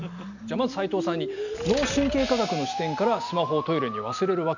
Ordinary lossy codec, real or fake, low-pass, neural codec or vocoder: none; real; 7.2 kHz; none